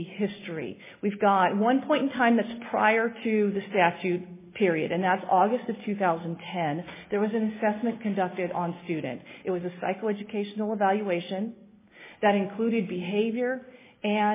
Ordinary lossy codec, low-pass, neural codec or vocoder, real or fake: MP3, 16 kbps; 3.6 kHz; none; real